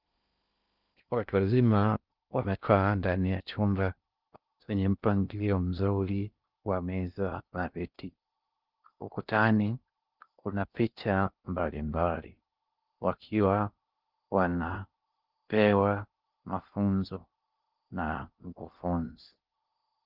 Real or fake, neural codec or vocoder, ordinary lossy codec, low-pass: fake; codec, 16 kHz in and 24 kHz out, 0.6 kbps, FocalCodec, streaming, 2048 codes; Opus, 24 kbps; 5.4 kHz